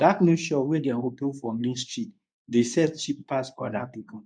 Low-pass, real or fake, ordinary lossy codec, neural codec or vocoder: 9.9 kHz; fake; none; codec, 24 kHz, 0.9 kbps, WavTokenizer, medium speech release version 2